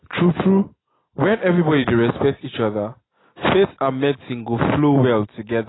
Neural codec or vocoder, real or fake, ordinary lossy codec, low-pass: none; real; AAC, 16 kbps; 7.2 kHz